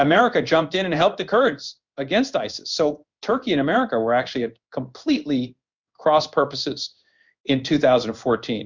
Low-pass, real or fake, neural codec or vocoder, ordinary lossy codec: 7.2 kHz; fake; codec, 16 kHz in and 24 kHz out, 1 kbps, XY-Tokenizer; Opus, 64 kbps